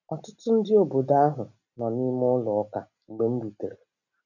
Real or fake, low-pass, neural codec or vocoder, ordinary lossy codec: real; 7.2 kHz; none; none